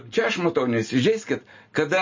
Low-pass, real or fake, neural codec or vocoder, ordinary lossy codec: 7.2 kHz; real; none; MP3, 32 kbps